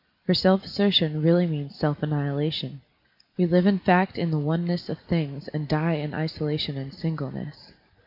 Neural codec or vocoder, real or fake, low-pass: none; real; 5.4 kHz